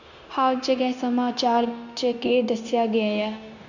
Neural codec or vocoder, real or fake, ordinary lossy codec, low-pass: codec, 16 kHz, 0.9 kbps, LongCat-Audio-Codec; fake; none; 7.2 kHz